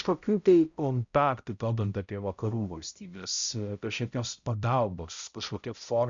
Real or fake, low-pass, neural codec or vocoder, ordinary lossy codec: fake; 7.2 kHz; codec, 16 kHz, 0.5 kbps, X-Codec, HuBERT features, trained on balanced general audio; Opus, 64 kbps